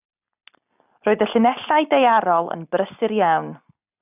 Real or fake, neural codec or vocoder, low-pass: real; none; 3.6 kHz